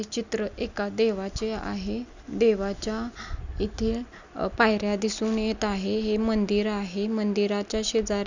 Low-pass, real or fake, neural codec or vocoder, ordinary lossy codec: 7.2 kHz; real; none; none